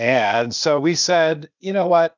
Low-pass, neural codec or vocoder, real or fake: 7.2 kHz; codec, 16 kHz, 0.8 kbps, ZipCodec; fake